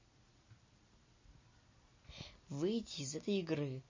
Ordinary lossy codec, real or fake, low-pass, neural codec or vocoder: MP3, 32 kbps; real; 7.2 kHz; none